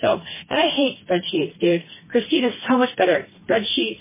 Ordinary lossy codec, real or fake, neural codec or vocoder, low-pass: MP3, 16 kbps; fake; codec, 16 kHz, 2 kbps, FreqCodec, smaller model; 3.6 kHz